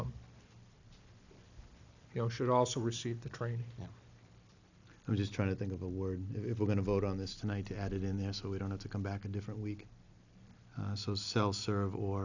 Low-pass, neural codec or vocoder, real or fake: 7.2 kHz; none; real